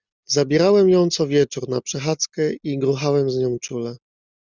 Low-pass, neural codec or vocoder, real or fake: 7.2 kHz; none; real